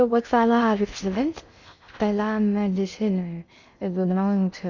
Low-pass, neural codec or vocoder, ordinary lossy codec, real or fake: 7.2 kHz; codec, 16 kHz in and 24 kHz out, 0.6 kbps, FocalCodec, streaming, 2048 codes; none; fake